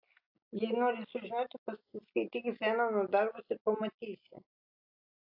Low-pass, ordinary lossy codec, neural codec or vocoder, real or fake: 5.4 kHz; AAC, 48 kbps; none; real